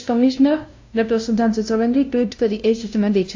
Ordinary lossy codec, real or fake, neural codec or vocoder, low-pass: AAC, 48 kbps; fake; codec, 16 kHz, 0.5 kbps, FunCodec, trained on LibriTTS, 25 frames a second; 7.2 kHz